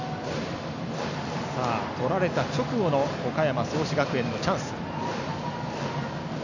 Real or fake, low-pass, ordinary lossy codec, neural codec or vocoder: real; 7.2 kHz; none; none